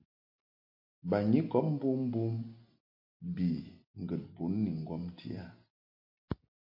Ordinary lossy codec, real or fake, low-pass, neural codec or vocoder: MP3, 32 kbps; real; 5.4 kHz; none